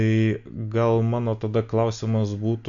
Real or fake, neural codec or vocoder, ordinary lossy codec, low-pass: real; none; MP3, 64 kbps; 7.2 kHz